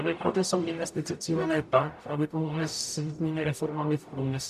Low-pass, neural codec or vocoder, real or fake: 14.4 kHz; codec, 44.1 kHz, 0.9 kbps, DAC; fake